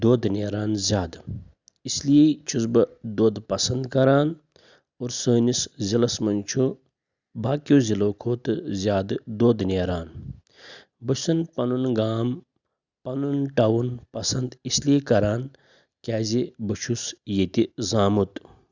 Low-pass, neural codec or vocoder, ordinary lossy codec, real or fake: 7.2 kHz; none; Opus, 64 kbps; real